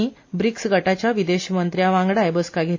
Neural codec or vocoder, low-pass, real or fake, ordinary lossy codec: none; 7.2 kHz; real; MP3, 32 kbps